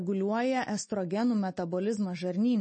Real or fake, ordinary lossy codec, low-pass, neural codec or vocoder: real; MP3, 32 kbps; 10.8 kHz; none